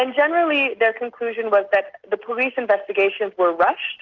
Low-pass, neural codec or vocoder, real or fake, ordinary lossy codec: 7.2 kHz; none; real; Opus, 24 kbps